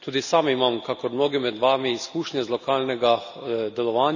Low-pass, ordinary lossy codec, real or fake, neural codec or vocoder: 7.2 kHz; none; real; none